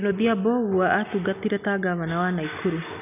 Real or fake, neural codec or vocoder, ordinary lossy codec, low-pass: real; none; none; 3.6 kHz